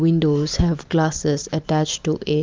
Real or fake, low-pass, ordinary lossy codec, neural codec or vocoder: real; 7.2 kHz; Opus, 24 kbps; none